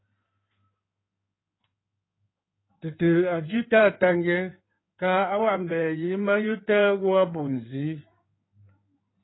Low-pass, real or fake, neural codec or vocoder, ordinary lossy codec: 7.2 kHz; fake; codec, 16 kHz in and 24 kHz out, 2.2 kbps, FireRedTTS-2 codec; AAC, 16 kbps